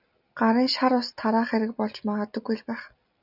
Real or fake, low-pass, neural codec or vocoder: real; 5.4 kHz; none